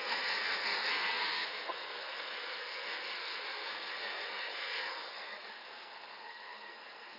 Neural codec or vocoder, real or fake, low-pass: codec, 44.1 kHz, 2.6 kbps, SNAC; fake; 5.4 kHz